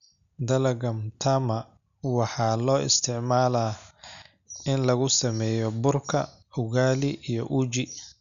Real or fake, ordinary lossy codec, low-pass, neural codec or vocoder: real; none; 7.2 kHz; none